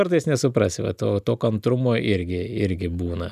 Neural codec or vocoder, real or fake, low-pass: none; real; 14.4 kHz